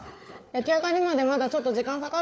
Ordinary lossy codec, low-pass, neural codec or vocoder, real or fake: none; none; codec, 16 kHz, 4 kbps, FunCodec, trained on Chinese and English, 50 frames a second; fake